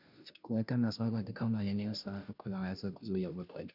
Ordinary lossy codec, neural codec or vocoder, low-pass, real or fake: none; codec, 16 kHz, 0.5 kbps, FunCodec, trained on Chinese and English, 25 frames a second; 5.4 kHz; fake